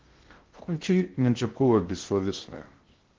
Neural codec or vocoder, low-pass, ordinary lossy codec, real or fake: codec, 16 kHz in and 24 kHz out, 0.6 kbps, FocalCodec, streaming, 2048 codes; 7.2 kHz; Opus, 16 kbps; fake